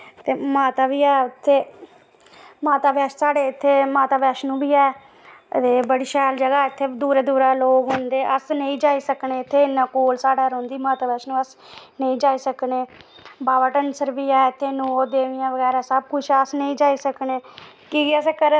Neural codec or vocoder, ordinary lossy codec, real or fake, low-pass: none; none; real; none